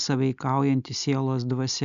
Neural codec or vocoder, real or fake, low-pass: none; real; 7.2 kHz